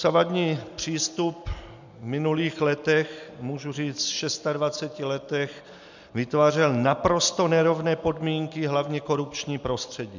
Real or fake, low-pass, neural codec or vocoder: real; 7.2 kHz; none